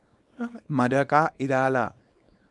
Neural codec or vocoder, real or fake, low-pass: codec, 24 kHz, 0.9 kbps, WavTokenizer, small release; fake; 10.8 kHz